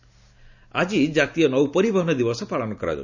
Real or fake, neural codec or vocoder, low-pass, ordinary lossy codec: real; none; 7.2 kHz; none